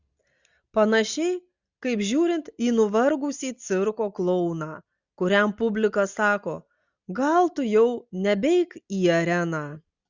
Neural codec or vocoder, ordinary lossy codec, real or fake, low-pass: none; Opus, 64 kbps; real; 7.2 kHz